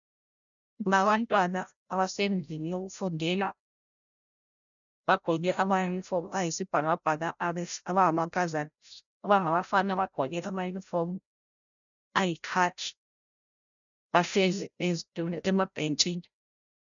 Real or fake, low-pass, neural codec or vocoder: fake; 7.2 kHz; codec, 16 kHz, 0.5 kbps, FreqCodec, larger model